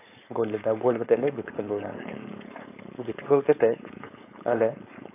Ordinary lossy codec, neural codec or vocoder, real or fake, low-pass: AAC, 24 kbps; codec, 16 kHz, 4.8 kbps, FACodec; fake; 3.6 kHz